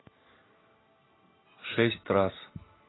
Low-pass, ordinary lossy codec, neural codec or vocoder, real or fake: 7.2 kHz; AAC, 16 kbps; none; real